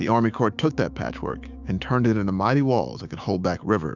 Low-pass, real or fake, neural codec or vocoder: 7.2 kHz; fake; codec, 16 kHz, 6 kbps, DAC